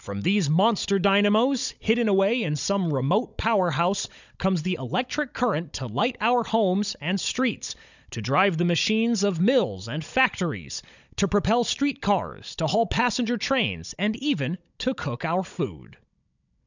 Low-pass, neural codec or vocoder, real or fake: 7.2 kHz; codec, 16 kHz, 16 kbps, FunCodec, trained on Chinese and English, 50 frames a second; fake